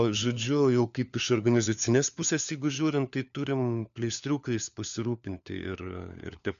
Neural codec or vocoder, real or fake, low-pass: codec, 16 kHz, 4 kbps, FunCodec, trained on LibriTTS, 50 frames a second; fake; 7.2 kHz